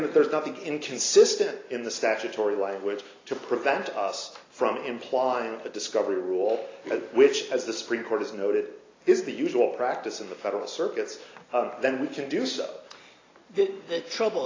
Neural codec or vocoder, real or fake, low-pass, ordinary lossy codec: none; real; 7.2 kHz; AAC, 32 kbps